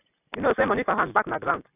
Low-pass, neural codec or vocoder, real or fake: 3.6 kHz; none; real